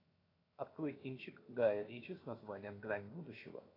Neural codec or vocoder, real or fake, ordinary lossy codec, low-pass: codec, 16 kHz, 0.7 kbps, FocalCodec; fake; AAC, 24 kbps; 5.4 kHz